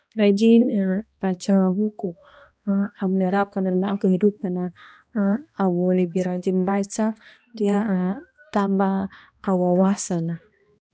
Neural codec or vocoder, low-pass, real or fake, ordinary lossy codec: codec, 16 kHz, 1 kbps, X-Codec, HuBERT features, trained on balanced general audio; none; fake; none